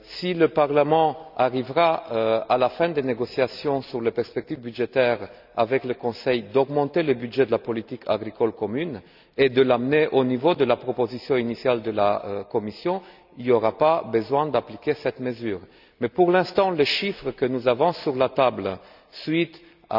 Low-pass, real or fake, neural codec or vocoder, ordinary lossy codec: 5.4 kHz; real; none; none